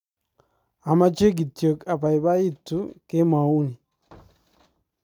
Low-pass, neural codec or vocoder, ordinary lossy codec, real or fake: 19.8 kHz; none; none; real